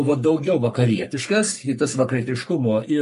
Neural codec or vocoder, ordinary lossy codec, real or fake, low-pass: codec, 44.1 kHz, 3.4 kbps, Pupu-Codec; MP3, 48 kbps; fake; 14.4 kHz